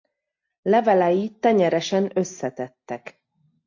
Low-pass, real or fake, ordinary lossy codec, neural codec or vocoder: 7.2 kHz; real; AAC, 48 kbps; none